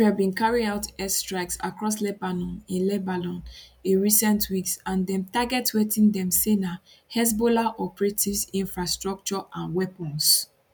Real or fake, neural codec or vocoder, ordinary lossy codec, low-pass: real; none; none; none